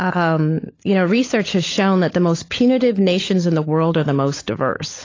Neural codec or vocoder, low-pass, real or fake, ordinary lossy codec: codec, 16 kHz, 16 kbps, FunCodec, trained on LibriTTS, 50 frames a second; 7.2 kHz; fake; AAC, 32 kbps